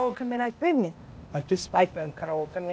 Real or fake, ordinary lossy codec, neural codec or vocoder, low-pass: fake; none; codec, 16 kHz, 0.8 kbps, ZipCodec; none